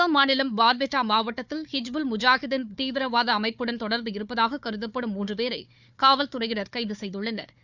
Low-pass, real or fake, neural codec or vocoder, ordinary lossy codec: 7.2 kHz; fake; codec, 16 kHz, 4 kbps, FunCodec, trained on Chinese and English, 50 frames a second; none